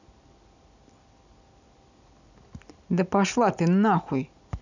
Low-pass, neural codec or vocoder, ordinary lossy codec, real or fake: 7.2 kHz; none; none; real